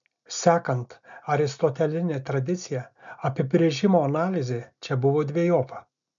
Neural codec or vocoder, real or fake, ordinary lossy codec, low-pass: none; real; MP3, 64 kbps; 7.2 kHz